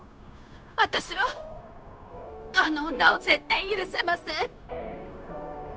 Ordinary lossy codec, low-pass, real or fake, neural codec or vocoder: none; none; fake; codec, 16 kHz, 0.9 kbps, LongCat-Audio-Codec